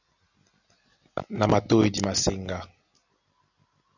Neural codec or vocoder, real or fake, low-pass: none; real; 7.2 kHz